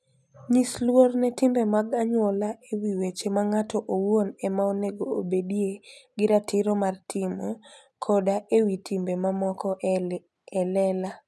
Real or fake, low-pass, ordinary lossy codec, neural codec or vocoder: real; none; none; none